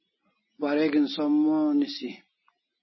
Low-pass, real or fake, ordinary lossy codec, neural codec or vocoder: 7.2 kHz; real; MP3, 24 kbps; none